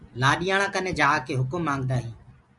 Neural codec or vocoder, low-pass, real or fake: none; 10.8 kHz; real